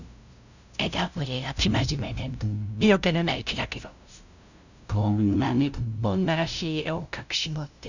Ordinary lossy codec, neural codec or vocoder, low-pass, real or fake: none; codec, 16 kHz, 0.5 kbps, FunCodec, trained on LibriTTS, 25 frames a second; 7.2 kHz; fake